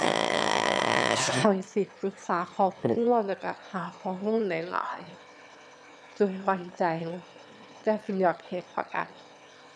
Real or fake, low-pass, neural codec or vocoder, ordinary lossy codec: fake; none; autoencoder, 22.05 kHz, a latent of 192 numbers a frame, VITS, trained on one speaker; none